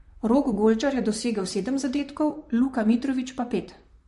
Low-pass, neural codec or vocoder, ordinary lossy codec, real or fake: 14.4 kHz; vocoder, 44.1 kHz, 128 mel bands, Pupu-Vocoder; MP3, 48 kbps; fake